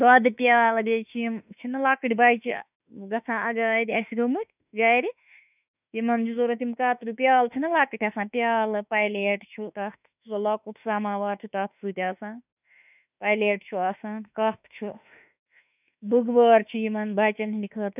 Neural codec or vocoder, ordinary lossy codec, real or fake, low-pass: autoencoder, 48 kHz, 32 numbers a frame, DAC-VAE, trained on Japanese speech; none; fake; 3.6 kHz